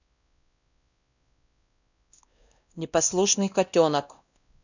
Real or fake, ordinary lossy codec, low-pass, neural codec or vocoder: fake; none; 7.2 kHz; codec, 16 kHz, 1 kbps, X-Codec, WavLM features, trained on Multilingual LibriSpeech